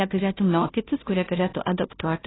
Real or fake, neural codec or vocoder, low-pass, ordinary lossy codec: fake; codec, 16 kHz, 0.5 kbps, FunCodec, trained on Chinese and English, 25 frames a second; 7.2 kHz; AAC, 16 kbps